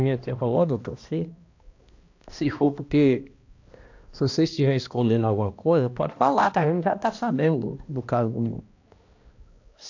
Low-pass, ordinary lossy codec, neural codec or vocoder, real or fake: 7.2 kHz; AAC, 48 kbps; codec, 16 kHz, 1 kbps, X-Codec, HuBERT features, trained on balanced general audio; fake